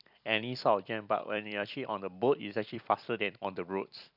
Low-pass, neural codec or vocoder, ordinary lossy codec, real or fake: 5.4 kHz; vocoder, 44.1 kHz, 128 mel bands every 256 samples, BigVGAN v2; none; fake